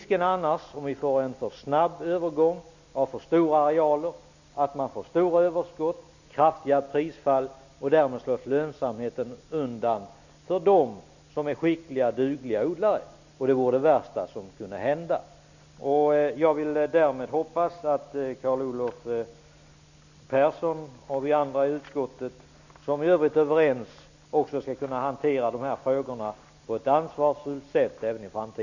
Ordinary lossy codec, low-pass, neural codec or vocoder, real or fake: none; 7.2 kHz; none; real